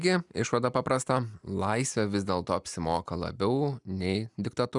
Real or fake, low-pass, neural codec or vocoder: real; 10.8 kHz; none